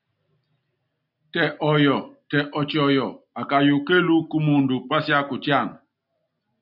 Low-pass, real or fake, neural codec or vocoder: 5.4 kHz; real; none